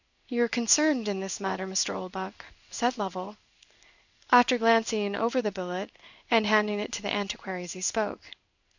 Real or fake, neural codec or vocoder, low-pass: fake; codec, 16 kHz in and 24 kHz out, 1 kbps, XY-Tokenizer; 7.2 kHz